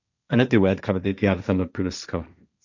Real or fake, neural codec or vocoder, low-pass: fake; codec, 16 kHz, 1.1 kbps, Voila-Tokenizer; 7.2 kHz